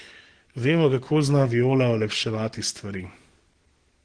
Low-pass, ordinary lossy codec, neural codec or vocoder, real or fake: 9.9 kHz; Opus, 16 kbps; codec, 44.1 kHz, 7.8 kbps, DAC; fake